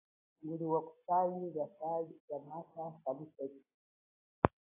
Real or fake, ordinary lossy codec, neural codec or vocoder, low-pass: real; AAC, 16 kbps; none; 3.6 kHz